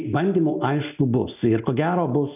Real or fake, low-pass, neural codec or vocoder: real; 3.6 kHz; none